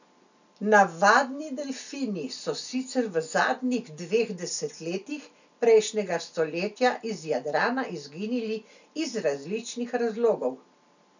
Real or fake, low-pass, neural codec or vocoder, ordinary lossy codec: real; 7.2 kHz; none; none